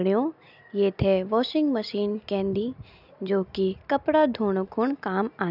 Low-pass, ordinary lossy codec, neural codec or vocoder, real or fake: 5.4 kHz; none; none; real